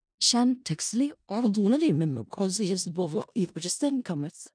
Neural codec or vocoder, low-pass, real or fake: codec, 16 kHz in and 24 kHz out, 0.4 kbps, LongCat-Audio-Codec, four codebook decoder; 9.9 kHz; fake